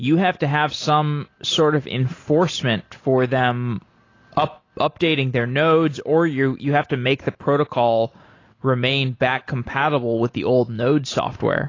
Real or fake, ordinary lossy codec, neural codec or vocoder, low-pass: real; AAC, 32 kbps; none; 7.2 kHz